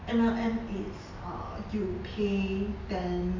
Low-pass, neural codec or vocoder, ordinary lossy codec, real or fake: 7.2 kHz; none; MP3, 48 kbps; real